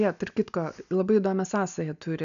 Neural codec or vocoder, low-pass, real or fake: none; 7.2 kHz; real